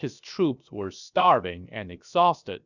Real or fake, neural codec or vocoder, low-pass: fake; codec, 16 kHz, 0.7 kbps, FocalCodec; 7.2 kHz